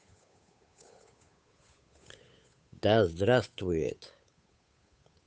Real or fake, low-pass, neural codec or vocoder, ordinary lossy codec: fake; none; codec, 16 kHz, 8 kbps, FunCodec, trained on Chinese and English, 25 frames a second; none